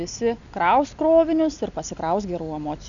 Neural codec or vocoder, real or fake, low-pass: none; real; 7.2 kHz